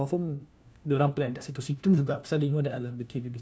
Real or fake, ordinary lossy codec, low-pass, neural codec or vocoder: fake; none; none; codec, 16 kHz, 1 kbps, FunCodec, trained on LibriTTS, 50 frames a second